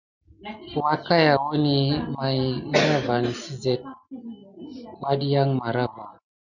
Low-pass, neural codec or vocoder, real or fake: 7.2 kHz; none; real